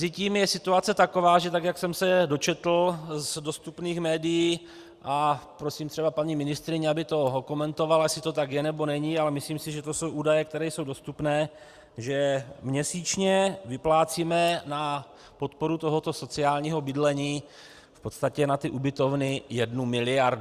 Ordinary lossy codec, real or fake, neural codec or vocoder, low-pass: Opus, 64 kbps; real; none; 14.4 kHz